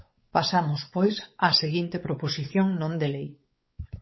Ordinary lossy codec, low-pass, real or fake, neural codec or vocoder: MP3, 24 kbps; 7.2 kHz; fake; codec, 16 kHz, 4 kbps, X-Codec, WavLM features, trained on Multilingual LibriSpeech